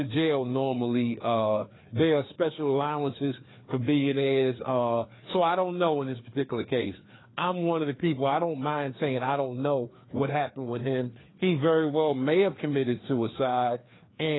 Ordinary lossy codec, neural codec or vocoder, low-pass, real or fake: AAC, 16 kbps; codec, 16 kHz, 2 kbps, FreqCodec, larger model; 7.2 kHz; fake